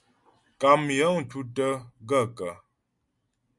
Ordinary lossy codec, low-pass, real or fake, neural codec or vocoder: MP3, 96 kbps; 10.8 kHz; real; none